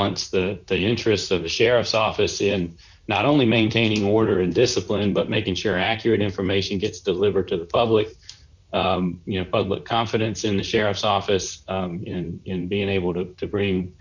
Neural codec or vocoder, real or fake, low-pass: vocoder, 44.1 kHz, 128 mel bands, Pupu-Vocoder; fake; 7.2 kHz